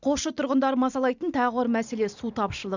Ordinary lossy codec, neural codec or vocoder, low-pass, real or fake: none; none; 7.2 kHz; real